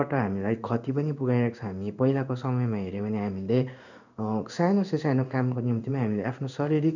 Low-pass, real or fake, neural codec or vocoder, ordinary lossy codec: 7.2 kHz; fake; codec, 16 kHz in and 24 kHz out, 1 kbps, XY-Tokenizer; none